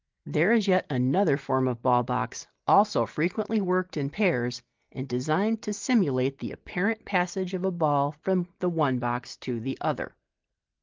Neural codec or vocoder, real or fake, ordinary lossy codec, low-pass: none; real; Opus, 32 kbps; 7.2 kHz